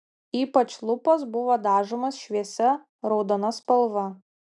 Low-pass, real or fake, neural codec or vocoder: 10.8 kHz; real; none